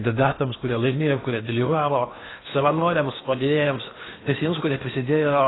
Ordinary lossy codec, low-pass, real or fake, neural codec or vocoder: AAC, 16 kbps; 7.2 kHz; fake; codec, 16 kHz in and 24 kHz out, 0.8 kbps, FocalCodec, streaming, 65536 codes